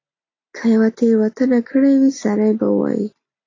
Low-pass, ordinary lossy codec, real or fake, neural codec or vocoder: 7.2 kHz; AAC, 32 kbps; real; none